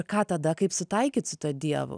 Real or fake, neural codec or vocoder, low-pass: real; none; 9.9 kHz